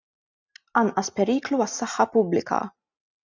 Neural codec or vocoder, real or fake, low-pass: none; real; 7.2 kHz